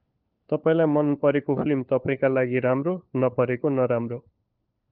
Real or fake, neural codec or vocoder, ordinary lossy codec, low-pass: fake; codec, 16 kHz, 4 kbps, FunCodec, trained on LibriTTS, 50 frames a second; Opus, 24 kbps; 5.4 kHz